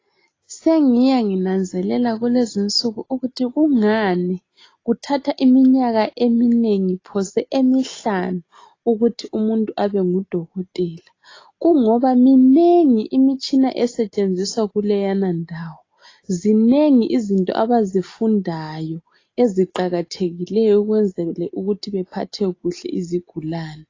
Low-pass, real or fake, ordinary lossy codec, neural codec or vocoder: 7.2 kHz; real; AAC, 32 kbps; none